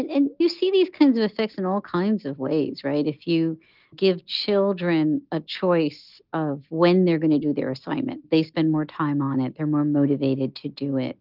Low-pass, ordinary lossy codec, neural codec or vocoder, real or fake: 5.4 kHz; Opus, 32 kbps; none; real